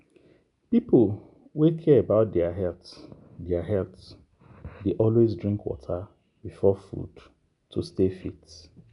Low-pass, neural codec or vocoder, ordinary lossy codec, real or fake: 10.8 kHz; none; none; real